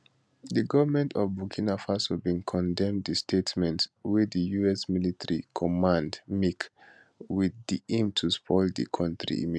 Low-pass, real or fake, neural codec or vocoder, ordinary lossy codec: none; real; none; none